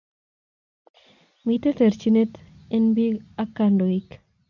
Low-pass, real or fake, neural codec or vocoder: 7.2 kHz; real; none